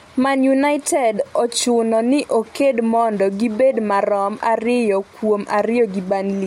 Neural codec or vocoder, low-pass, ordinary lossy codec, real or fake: none; 19.8 kHz; MP3, 64 kbps; real